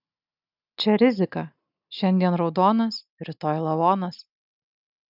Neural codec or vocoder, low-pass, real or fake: none; 5.4 kHz; real